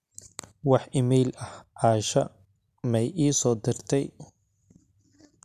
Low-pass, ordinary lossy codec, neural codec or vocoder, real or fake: 14.4 kHz; none; none; real